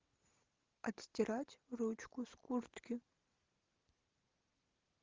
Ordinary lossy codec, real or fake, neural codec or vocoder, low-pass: Opus, 32 kbps; real; none; 7.2 kHz